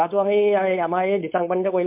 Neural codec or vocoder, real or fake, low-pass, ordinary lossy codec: codec, 16 kHz, 4.8 kbps, FACodec; fake; 3.6 kHz; MP3, 32 kbps